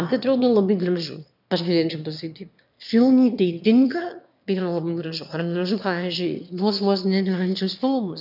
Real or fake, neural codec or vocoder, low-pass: fake; autoencoder, 22.05 kHz, a latent of 192 numbers a frame, VITS, trained on one speaker; 5.4 kHz